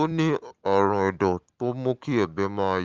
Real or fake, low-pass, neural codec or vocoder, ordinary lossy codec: real; 7.2 kHz; none; Opus, 32 kbps